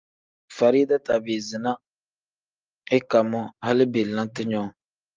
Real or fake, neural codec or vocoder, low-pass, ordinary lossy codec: real; none; 7.2 kHz; Opus, 24 kbps